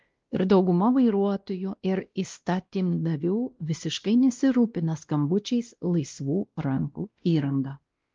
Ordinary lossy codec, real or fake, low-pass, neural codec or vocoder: Opus, 32 kbps; fake; 7.2 kHz; codec, 16 kHz, 1 kbps, X-Codec, WavLM features, trained on Multilingual LibriSpeech